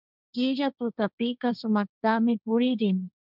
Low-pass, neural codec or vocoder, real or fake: 5.4 kHz; codec, 16 kHz, 1.1 kbps, Voila-Tokenizer; fake